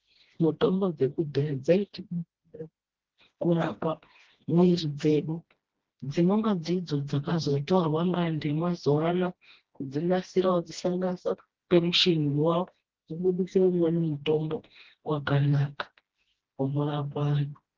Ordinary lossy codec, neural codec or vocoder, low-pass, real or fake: Opus, 16 kbps; codec, 16 kHz, 1 kbps, FreqCodec, smaller model; 7.2 kHz; fake